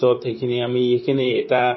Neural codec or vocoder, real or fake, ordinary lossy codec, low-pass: vocoder, 44.1 kHz, 128 mel bands, Pupu-Vocoder; fake; MP3, 24 kbps; 7.2 kHz